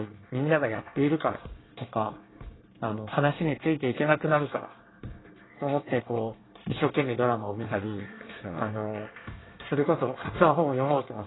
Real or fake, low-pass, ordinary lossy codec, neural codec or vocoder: fake; 7.2 kHz; AAC, 16 kbps; codec, 24 kHz, 1 kbps, SNAC